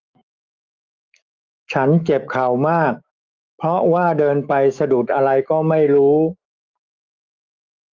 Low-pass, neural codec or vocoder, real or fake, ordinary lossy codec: 7.2 kHz; autoencoder, 48 kHz, 128 numbers a frame, DAC-VAE, trained on Japanese speech; fake; Opus, 32 kbps